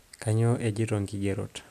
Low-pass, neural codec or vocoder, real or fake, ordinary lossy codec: 14.4 kHz; none; real; none